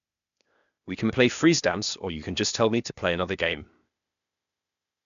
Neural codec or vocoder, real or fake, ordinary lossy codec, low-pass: codec, 16 kHz, 0.8 kbps, ZipCodec; fake; none; 7.2 kHz